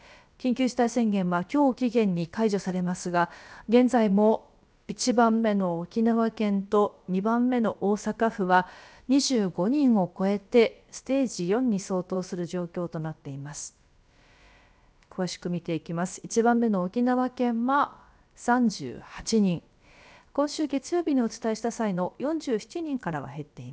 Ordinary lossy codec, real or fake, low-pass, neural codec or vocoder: none; fake; none; codec, 16 kHz, about 1 kbps, DyCAST, with the encoder's durations